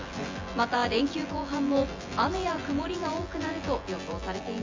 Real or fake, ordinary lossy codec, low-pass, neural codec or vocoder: fake; MP3, 64 kbps; 7.2 kHz; vocoder, 24 kHz, 100 mel bands, Vocos